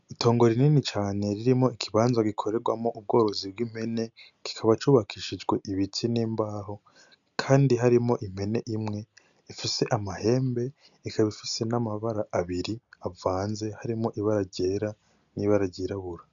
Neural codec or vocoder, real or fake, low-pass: none; real; 7.2 kHz